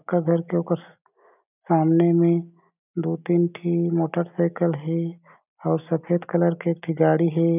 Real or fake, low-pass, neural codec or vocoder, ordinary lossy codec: real; 3.6 kHz; none; none